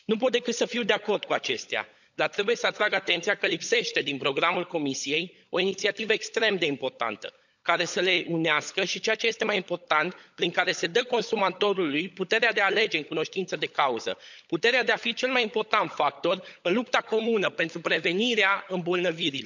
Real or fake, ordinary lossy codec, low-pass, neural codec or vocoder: fake; none; 7.2 kHz; codec, 16 kHz, 16 kbps, FunCodec, trained on LibriTTS, 50 frames a second